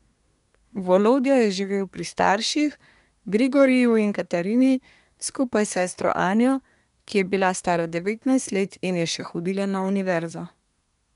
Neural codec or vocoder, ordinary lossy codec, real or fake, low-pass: codec, 24 kHz, 1 kbps, SNAC; none; fake; 10.8 kHz